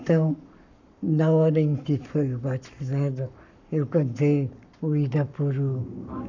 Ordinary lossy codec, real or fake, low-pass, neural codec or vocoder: none; fake; 7.2 kHz; codec, 44.1 kHz, 7.8 kbps, Pupu-Codec